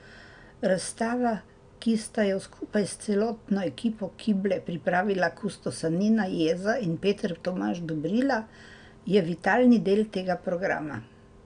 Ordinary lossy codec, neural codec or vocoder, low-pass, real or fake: none; none; 9.9 kHz; real